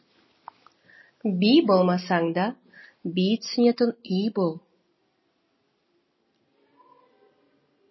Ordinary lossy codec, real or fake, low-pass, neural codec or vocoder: MP3, 24 kbps; real; 7.2 kHz; none